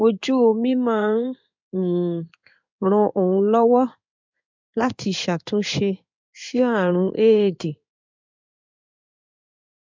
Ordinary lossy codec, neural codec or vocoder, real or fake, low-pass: MP3, 64 kbps; codec, 16 kHz in and 24 kHz out, 1 kbps, XY-Tokenizer; fake; 7.2 kHz